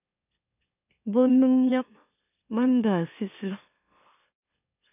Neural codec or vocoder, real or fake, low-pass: autoencoder, 44.1 kHz, a latent of 192 numbers a frame, MeloTTS; fake; 3.6 kHz